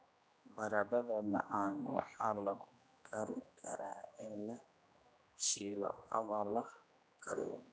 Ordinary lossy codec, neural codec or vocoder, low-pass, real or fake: none; codec, 16 kHz, 1 kbps, X-Codec, HuBERT features, trained on general audio; none; fake